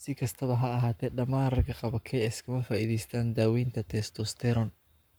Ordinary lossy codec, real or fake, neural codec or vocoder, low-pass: none; fake; codec, 44.1 kHz, 7.8 kbps, Pupu-Codec; none